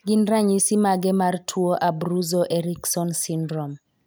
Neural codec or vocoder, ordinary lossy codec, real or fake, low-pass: none; none; real; none